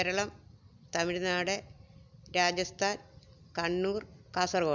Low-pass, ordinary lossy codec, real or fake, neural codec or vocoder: 7.2 kHz; none; real; none